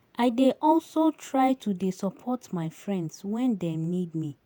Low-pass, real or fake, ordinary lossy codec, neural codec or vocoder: none; fake; none; vocoder, 48 kHz, 128 mel bands, Vocos